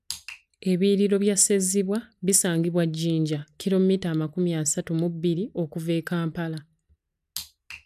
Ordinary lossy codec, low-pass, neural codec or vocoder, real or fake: none; 14.4 kHz; none; real